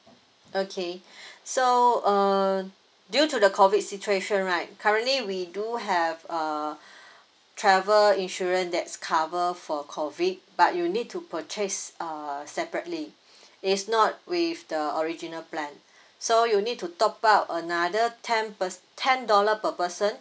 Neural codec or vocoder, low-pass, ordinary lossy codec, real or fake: none; none; none; real